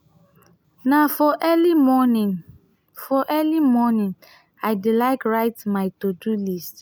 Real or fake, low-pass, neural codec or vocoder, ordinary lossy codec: real; none; none; none